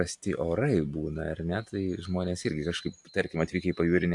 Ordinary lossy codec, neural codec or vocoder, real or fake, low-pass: AAC, 64 kbps; none; real; 10.8 kHz